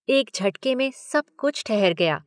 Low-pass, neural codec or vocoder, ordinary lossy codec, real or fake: 9.9 kHz; none; none; real